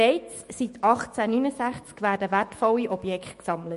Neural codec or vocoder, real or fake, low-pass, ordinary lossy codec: autoencoder, 48 kHz, 128 numbers a frame, DAC-VAE, trained on Japanese speech; fake; 14.4 kHz; MP3, 48 kbps